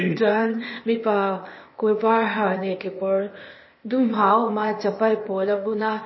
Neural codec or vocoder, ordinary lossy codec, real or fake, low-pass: codec, 16 kHz, 0.8 kbps, ZipCodec; MP3, 24 kbps; fake; 7.2 kHz